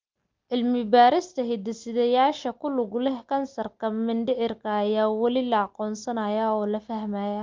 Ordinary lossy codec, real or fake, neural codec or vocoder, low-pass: Opus, 24 kbps; real; none; 7.2 kHz